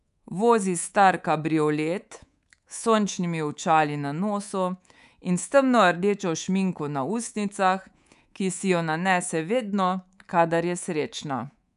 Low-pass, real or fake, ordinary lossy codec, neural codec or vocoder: 10.8 kHz; fake; none; codec, 24 kHz, 3.1 kbps, DualCodec